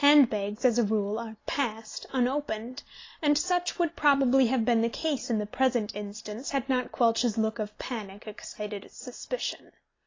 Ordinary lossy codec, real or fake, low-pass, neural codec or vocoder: AAC, 32 kbps; real; 7.2 kHz; none